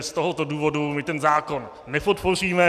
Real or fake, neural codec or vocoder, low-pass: real; none; 14.4 kHz